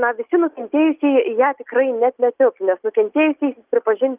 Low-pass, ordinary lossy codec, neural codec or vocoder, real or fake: 3.6 kHz; Opus, 32 kbps; none; real